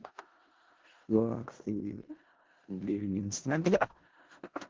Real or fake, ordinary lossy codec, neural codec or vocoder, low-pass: fake; Opus, 16 kbps; codec, 16 kHz in and 24 kHz out, 0.4 kbps, LongCat-Audio-Codec, four codebook decoder; 7.2 kHz